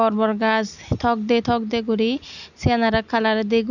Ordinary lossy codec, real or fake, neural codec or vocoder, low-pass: none; real; none; 7.2 kHz